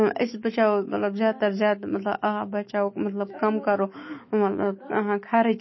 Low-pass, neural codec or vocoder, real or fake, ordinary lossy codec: 7.2 kHz; autoencoder, 48 kHz, 128 numbers a frame, DAC-VAE, trained on Japanese speech; fake; MP3, 24 kbps